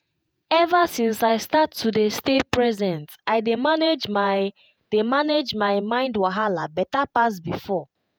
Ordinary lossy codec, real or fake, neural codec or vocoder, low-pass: none; fake; vocoder, 48 kHz, 128 mel bands, Vocos; none